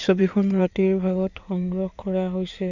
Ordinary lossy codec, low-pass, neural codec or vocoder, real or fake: none; 7.2 kHz; codec, 16 kHz in and 24 kHz out, 2.2 kbps, FireRedTTS-2 codec; fake